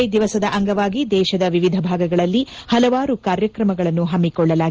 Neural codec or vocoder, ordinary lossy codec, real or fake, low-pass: none; Opus, 16 kbps; real; 7.2 kHz